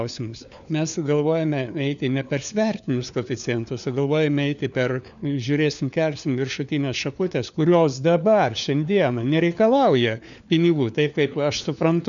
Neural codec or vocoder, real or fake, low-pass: codec, 16 kHz, 4 kbps, FunCodec, trained on LibriTTS, 50 frames a second; fake; 7.2 kHz